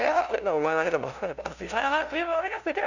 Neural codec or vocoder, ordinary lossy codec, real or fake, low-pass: codec, 16 kHz, 0.5 kbps, FunCodec, trained on LibriTTS, 25 frames a second; none; fake; 7.2 kHz